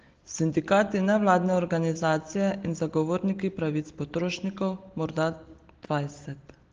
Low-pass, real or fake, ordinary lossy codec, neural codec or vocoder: 7.2 kHz; real; Opus, 16 kbps; none